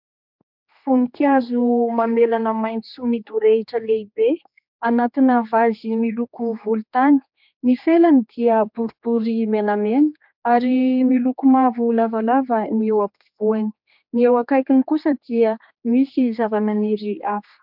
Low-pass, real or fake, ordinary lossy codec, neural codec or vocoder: 5.4 kHz; fake; MP3, 48 kbps; codec, 16 kHz, 2 kbps, X-Codec, HuBERT features, trained on general audio